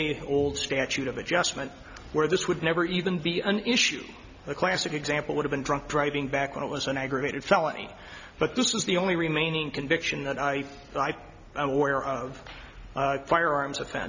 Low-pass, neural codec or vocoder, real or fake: 7.2 kHz; none; real